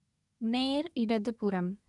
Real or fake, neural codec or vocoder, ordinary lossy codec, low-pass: fake; codec, 24 kHz, 1 kbps, SNAC; none; 10.8 kHz